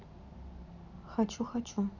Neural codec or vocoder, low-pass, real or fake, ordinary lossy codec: none; 7.2 kHz; real; none